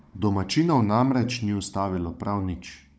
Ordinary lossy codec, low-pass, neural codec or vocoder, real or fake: none; none; codec, 16 kHz, 16 kbps, FunCodec, trained on Chinese and English, 50 frames a second; fake